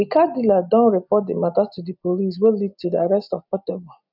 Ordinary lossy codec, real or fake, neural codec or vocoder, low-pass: none; real; none; 5.4 kHz